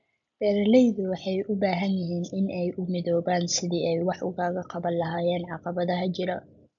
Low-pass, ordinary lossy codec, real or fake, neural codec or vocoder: 7.2 kHz; MP3, 96 kbps; real; none